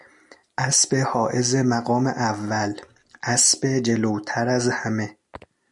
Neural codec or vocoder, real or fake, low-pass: none; real; 10.8 kHz